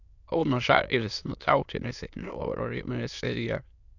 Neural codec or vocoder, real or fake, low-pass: autoencoder, 22.05 kHz, a latent of 192 numbers a frame, VITS, trained on many speakers; fake; 7.2 kHz